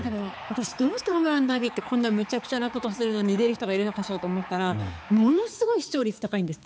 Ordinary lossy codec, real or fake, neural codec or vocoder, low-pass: none; fake; codec, 16 kHz, 4 kbps, X-Codec, HuBERT features, trained on balanced general audio; none